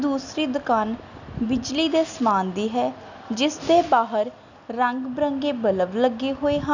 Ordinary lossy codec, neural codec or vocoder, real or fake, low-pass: none; none; real; 7.2 kHz